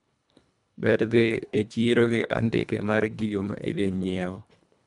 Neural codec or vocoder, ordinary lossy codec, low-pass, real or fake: codec, 24 kHz, 1.5 kbps, HILCodec; none; 10.8 kHz; fake